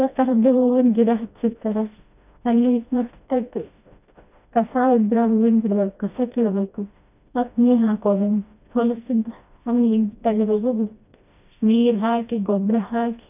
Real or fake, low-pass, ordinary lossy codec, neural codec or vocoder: fake; 3.6 kHz; none; codec, 16 kHz, 1 kbps, FreqCodec, smaller model